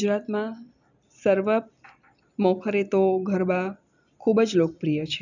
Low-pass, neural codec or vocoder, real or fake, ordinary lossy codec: 7.2 kHz; none; real; none